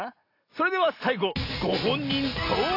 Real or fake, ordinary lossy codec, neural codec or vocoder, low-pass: real; AAC, 32 kbps; none; 5.4 kHz